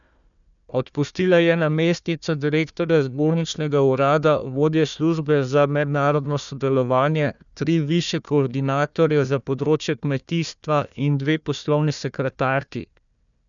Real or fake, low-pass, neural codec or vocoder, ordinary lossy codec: fake; 7.2 kHz; codec, 16 kHz, 1 kbps, FunCodec, trained on Chinese and English, 50 frames a second; none